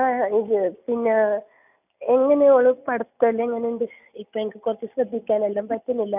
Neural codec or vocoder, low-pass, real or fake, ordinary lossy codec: none; 3.6 kHz; real; none